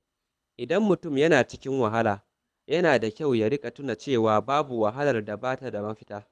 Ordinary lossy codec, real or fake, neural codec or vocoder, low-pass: none; fake; codec, 24 kHz, 6 kbps, HILCodec; none